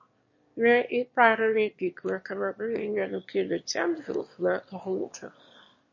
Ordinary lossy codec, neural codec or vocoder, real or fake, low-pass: MP3, 32 kbps; autoencoder, 22.05 kHz, a latent of 192 numbers a frame, VITS, trained on one speaker; fake; 7.2 kHz